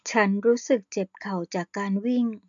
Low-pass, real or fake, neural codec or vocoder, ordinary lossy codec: 7.2 kHz; real; none; AAC, 64 kbps